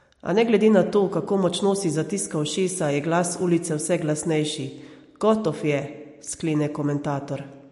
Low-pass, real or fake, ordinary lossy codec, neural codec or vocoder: 14.4 kHz; real; MP3, 48 kbps; none